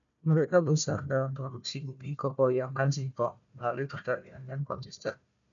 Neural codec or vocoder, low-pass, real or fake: codec, 16 kHz, 1 kbps, FunCodec, trained on Chinese and English, 50 frames a second; 7.2 kHz; fake